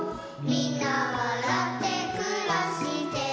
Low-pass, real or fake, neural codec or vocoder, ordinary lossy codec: none; real; none; none